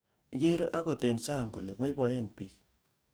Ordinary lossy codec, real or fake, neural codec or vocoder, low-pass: none; fake; codec, 44.1 kHz, 2.6 kbps, DAC; none